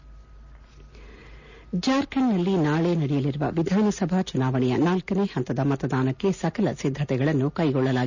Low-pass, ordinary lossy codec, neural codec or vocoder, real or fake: 7.2 kHz; none; none; real